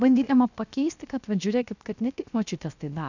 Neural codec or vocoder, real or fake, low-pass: codec, 16 kHz, 0.7 kbps, FocalCodec; fake; 7.2 kHz